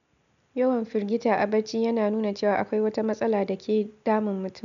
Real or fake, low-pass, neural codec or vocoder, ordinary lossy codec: real; 7.2 kHz; none; none